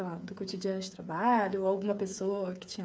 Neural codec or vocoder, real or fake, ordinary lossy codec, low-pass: codec, 16 kHz, 8 kbps, FreqCodec, smaller model; fake; none; none